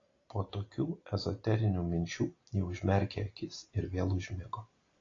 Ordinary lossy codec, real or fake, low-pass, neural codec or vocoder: AAC, 32 kbps; real; 7.2 kHz; none